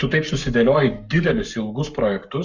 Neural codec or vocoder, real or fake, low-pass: codec, 44.1 kHz, 7.8 kbps, Pupu-Codec; fake; 7.2 kHz